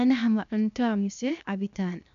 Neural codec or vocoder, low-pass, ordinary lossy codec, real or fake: codec, 16 kHz, 0.7 kbps, FocalCodec; 7.2 kHz; none; fake